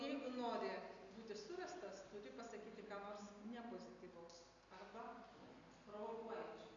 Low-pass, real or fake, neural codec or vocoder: 7.2 kHz; real; none